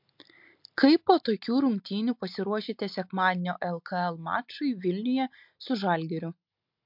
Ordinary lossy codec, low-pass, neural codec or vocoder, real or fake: MP3, 48 kbps; 5.4 kHz; none; real